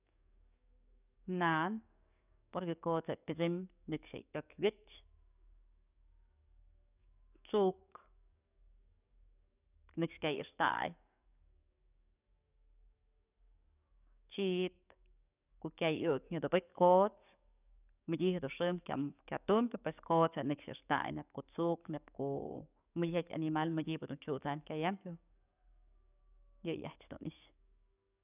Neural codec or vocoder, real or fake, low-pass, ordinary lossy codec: codec, 44.1 kHz, 7.8 kbps, DAC; fake; 3.6 kHz; none